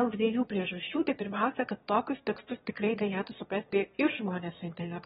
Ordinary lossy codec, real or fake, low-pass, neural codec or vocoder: AAC, 16 kbps; fake; 9.9 kHz; autoencoder, 22.05 kHz, a latent of 192 numbers a frame, VITS, trained on one speaker